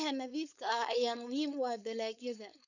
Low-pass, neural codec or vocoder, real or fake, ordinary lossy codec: 7.2 kHz; codec, 16 kHz, 4.8 kbps, FACodec; fake; none